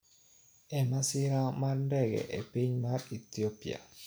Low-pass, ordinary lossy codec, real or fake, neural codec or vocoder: none; none; real; none